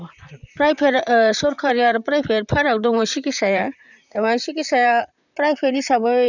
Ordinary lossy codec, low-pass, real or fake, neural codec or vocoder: none; 7.2 kHz; fake; vocoder, 44.1 kHz, 128 mel bands, Pupu-Vocoder